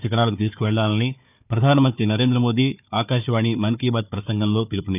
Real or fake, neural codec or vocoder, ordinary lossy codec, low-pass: fake; codec, 16 kHz, 4 kbps, FunCodec, trained on Chinese and English, 50 frames a second; none; 3.6 kHz